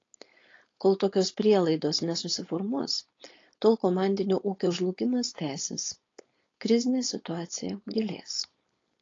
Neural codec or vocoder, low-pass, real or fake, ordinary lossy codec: codec, 16 kHz, 4.8 kbps, FACodec; 7.2 kHz; fake; AAC, 32 kbps